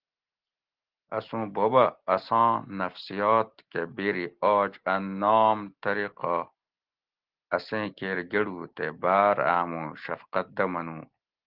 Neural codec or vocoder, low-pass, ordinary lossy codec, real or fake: none; 5.4 kHz; Opus, 16 kbps; real